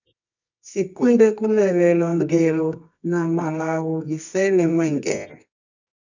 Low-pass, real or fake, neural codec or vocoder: 7.2 kHz; fake; codec, 24 kHz, 0.9 kbps, WavTokenizer, medium music audio release